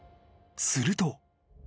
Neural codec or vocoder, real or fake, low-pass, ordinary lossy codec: none; real; none; none